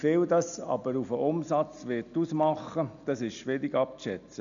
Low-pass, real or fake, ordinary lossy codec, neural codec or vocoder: 7.2 kHz; real; none; none